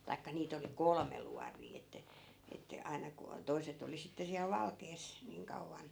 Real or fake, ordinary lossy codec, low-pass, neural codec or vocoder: real; none; none; none